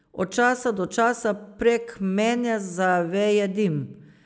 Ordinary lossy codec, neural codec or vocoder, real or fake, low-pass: none; none; real; none